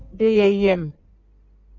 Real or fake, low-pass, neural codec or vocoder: fake; 7.2 kHz; codec, 16 kHz in and 24 kHz out, 1.1 kbps, FireRedTTS-2 codec